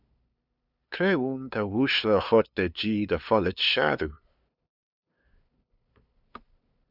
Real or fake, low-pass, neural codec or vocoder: fake; 5.4 kHz; codec, 16 kHz, 2 kbps, FunCodec, trained on LibriTTS, 25 frames a second